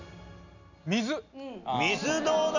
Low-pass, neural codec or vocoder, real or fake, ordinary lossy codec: 7.2 kHz; none; real; none